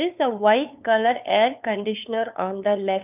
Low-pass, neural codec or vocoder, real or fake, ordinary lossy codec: 3.6 kHz; codec, 16 kHz, 2 kbps, FunCodec, trained on LibriTTS, 25 frames a second; fake; none